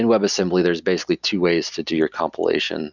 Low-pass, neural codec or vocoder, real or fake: 7.2 kHz; none; real